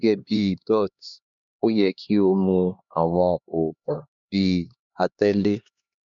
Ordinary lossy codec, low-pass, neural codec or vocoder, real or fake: none; 7.2 kHz; codec, 16 kHz, 2 kbps, X-Codec, HuBERT features, trained on LibriSpeech; fake